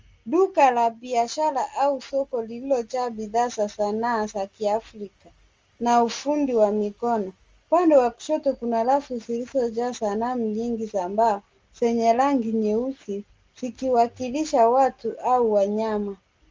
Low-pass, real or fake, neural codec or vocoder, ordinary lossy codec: 7.2 kHz; real; none; Opus, 32 kbps